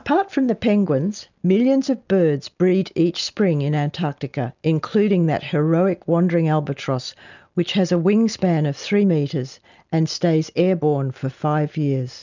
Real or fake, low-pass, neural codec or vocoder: real; 7.2 kHz; none